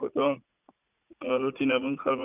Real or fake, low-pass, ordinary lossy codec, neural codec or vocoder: fake; 3.6 kHz; none; vocoder, 44.1 kHz, 80 mel bands, Vocos